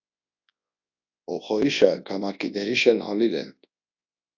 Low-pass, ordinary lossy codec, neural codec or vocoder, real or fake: 7.2 kHz; AAC, 48 kbps; codec, 24 kHz, 0.9 kbps, WavTokenizer, large speech release; fake